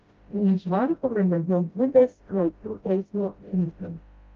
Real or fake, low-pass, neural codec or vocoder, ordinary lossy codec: fake; 7.2 kHz; codec, 16 kHz, 0.5 kbps, FreqCodec, smaller model; Opus, 24 kbps